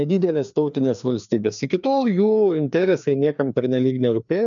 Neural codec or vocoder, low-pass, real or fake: codec, 16 kHz, 2 kbps, FreqCodec, larger model; 7.2 kHz; fake